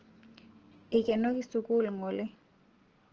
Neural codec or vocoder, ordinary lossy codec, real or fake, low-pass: none; Opus, 16 kbps; real; 7.2 kHz